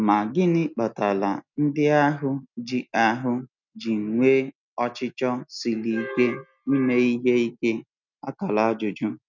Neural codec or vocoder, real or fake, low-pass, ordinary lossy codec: none; real; 7.2 kHz; none